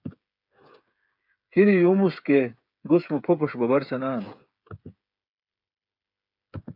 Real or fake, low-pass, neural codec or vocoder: fake; 5.4 kHz; codec, 16 kHz, 8 kbps, FreqCodec, smaller model